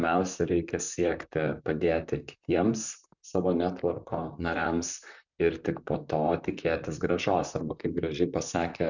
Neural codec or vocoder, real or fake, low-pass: vocoder, 44.1 kHz, 128 mel bands, Pupu-Vocoder; fake; 7.2 kHz